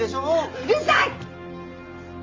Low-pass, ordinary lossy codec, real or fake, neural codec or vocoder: 7.2 kHz; Opus, 32 kbps; real; none